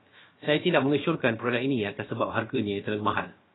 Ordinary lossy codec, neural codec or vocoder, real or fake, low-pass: AAC, 16 kbps; codec, 16 kHz, about 1 kbps, DyCAST, with the encoder's durations; fake; 7.2 kHz